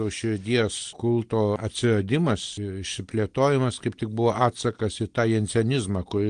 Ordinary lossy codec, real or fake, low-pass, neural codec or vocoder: Opus, 24 kbps; real; 9.9 kHz; none